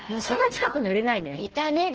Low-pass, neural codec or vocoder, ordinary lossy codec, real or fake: 7.2 kHz; codec, 16 kHz, 1 kbps, FunCodec, trained on Chinese and English, 50 frames a second; Opus, 16 kbps; fake